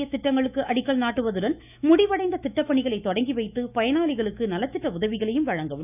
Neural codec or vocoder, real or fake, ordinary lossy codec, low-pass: autoencoder, 48 kHz, 128 numbers a frame, DAC-VAE, trained on Japanese speech; fake; none; 3.6 kHz